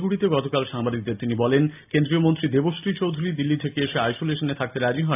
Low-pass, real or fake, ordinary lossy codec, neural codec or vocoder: 3.6 kHz; real; AAC, 32 kbps; none